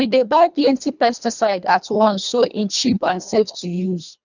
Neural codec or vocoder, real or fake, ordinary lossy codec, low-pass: codec, 24 kHz, 1.5 kbps, HILCodec; fake; none; 7.2 kHz